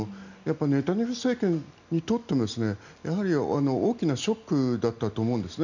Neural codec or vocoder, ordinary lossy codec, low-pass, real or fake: none; none; 7.2 kHz; real